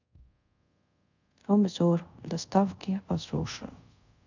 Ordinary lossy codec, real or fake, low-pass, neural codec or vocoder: none; fake; 7.2 kHz; codec, 24 kHz, 0.5 kbps, DualCodec